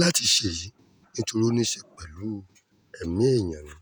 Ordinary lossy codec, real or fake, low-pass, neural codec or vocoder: none; fake; none; vocoder, 48 kHz, 128 mel bands, Vocos